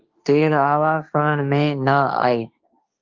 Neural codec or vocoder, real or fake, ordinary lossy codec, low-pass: codec, 16 kHz, 1.1 kbps, Voila-Tokenizer; fake; Opus, 24 kbps; 7.2 kHz